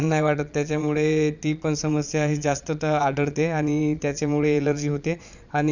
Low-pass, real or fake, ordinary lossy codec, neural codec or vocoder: 7.2 kHz; fake; none; vocoder, 22.05 kHz, 80 mel bands, Vocos